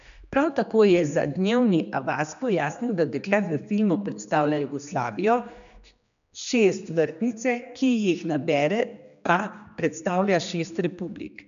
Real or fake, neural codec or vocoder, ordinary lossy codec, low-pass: fake; codec, 16 kHz, 2 kbps, X-Codec, HuBERT features, trained on general audio; none; 7.2 kHz